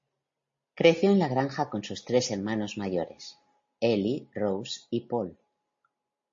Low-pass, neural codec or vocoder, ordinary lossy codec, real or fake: 7.2 kHz; none; MP3, 32 kbps; real